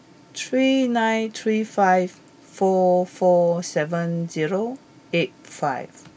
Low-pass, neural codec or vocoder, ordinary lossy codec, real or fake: none; none; none; real